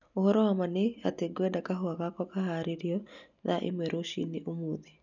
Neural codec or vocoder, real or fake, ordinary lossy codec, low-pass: none; real; none; 7.2 kHz